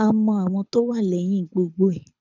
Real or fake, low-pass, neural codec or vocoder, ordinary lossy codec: fake; 7.2 kHz; codec, 24 kHz, 6 kbps, HILCodec; none